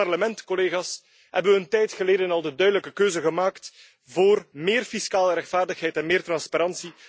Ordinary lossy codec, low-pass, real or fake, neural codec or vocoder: none; none; real; none